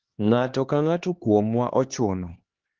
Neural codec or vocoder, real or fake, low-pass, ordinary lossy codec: codec, 16 kHz, 2 kbps, X-Codec, HuBERT features, trained on LibriSpeech; fake; 7.2 kHz; Opus, 16 kbps